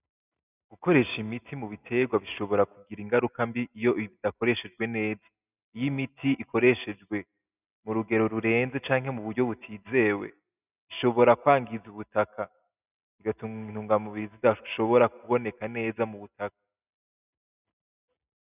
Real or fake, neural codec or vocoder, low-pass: real; none; 3.6 kHz